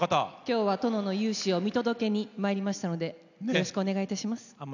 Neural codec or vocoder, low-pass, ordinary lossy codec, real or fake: none; 7.2 kHz; none; real